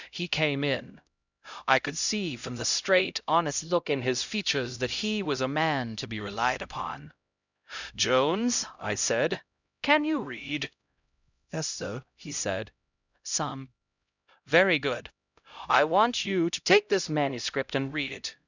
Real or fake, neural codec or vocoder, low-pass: fake; codec, 16 kHz, 0.5 kbps, X-Codec, HuBERT features, trained on LibriSpeech; 7.2 kHz